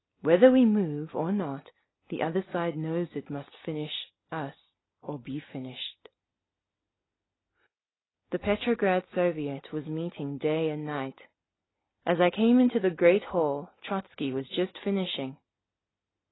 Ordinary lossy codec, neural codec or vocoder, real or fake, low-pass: AAC, 16 kbps; none; real; 7.2 kHz